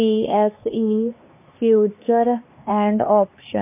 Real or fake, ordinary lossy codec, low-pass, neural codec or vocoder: fake; MP3, 24 kbps; 3.6 kHz; codec, 16 kHz, 2 kbps, X-Codec, HuBERT features, trained on LibriSpeech